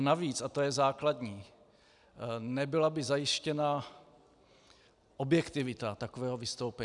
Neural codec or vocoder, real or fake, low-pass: none; real; 10.8 kHz